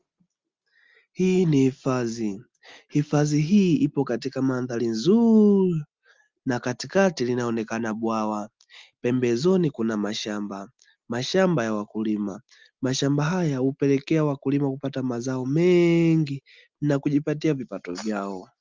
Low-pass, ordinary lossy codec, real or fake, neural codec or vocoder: 7.2 kHz; Opus, 32 kbps; real; none